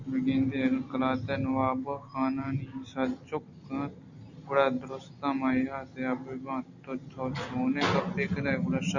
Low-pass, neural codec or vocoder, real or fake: 7.2 kHz; none; real